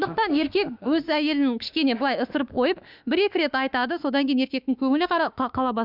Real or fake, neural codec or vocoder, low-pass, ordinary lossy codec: fake; autoencoder, 48 kHz, 32 numbers a frame, DAC-VAE, trained on Japanese speech; 5.4 kHz; none